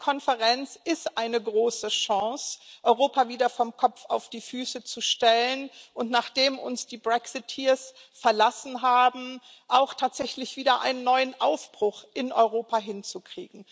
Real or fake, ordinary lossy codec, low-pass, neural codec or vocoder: real; none; none; none